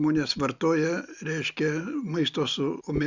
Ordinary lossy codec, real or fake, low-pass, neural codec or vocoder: Opus, 64 kbps; real; 7.2 kHz; none